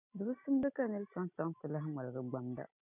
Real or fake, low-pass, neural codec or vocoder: real; 3.6 kHz; none